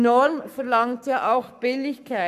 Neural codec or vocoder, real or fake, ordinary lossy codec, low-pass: codec, 44.1 kHz, 7.8 kbps, Pupu-Codec; fake; none; 14.4 kHz